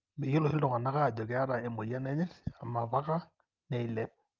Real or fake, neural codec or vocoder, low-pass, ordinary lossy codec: real; none; 7.2 kHz; Opus, 24 kbps